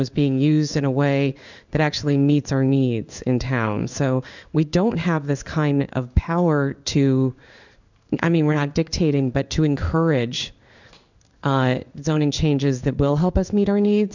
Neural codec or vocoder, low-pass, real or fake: codec, 16 kHz in and 24 kHz out, 1 kbps, XY-Tokenizer; 7.2 kHz; fake